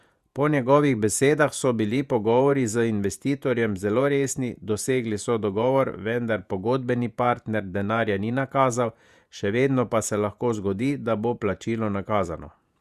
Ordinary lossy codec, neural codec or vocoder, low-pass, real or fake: Opus, 64 kbps; vocoder, 48 kHz, 128 mel bands, Vocos; 14.4 kHz; fake